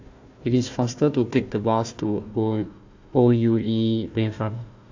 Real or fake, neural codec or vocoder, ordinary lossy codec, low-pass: fake; codec, 16 kHz, 1 kbps, FunCodec, trained on Chinese and English, 50 frames a second; AAC, 48 kbps; 7.2 kHz